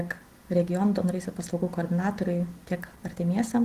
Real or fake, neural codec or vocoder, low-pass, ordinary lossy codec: real; none; 14.4 kHz; Opus, 16 kbps